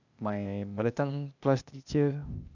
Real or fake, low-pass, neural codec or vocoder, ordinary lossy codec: fake; 7.2 kHz; codec, 16 kHz, 0.8 kbps, ZipCodec; none